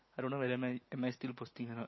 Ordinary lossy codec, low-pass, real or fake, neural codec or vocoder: MP3, 24 kbps; 7.2 kHz; real; none